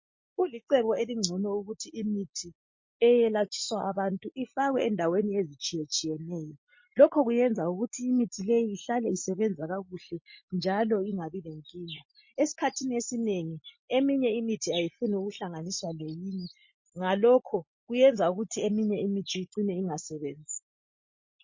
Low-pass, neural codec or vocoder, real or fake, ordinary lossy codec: 7.2 kHz; none; real; MP3, 32 kbps